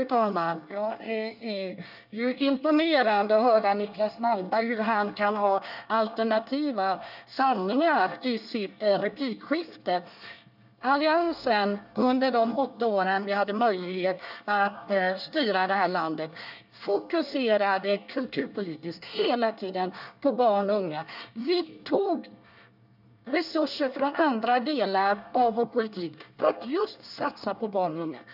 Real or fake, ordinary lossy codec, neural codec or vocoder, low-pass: fake; none; codec, 24 kHz, 1 kbps, SNAC; 5.4 kHz